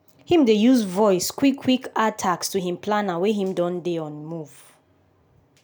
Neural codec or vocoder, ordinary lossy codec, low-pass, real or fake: none; none; none; real